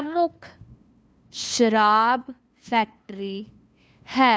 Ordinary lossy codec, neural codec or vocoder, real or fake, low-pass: none; codec, 16 kHz, 2 kbps, FunCodec, trained on LibriTTS, 25 frames a second; fake; none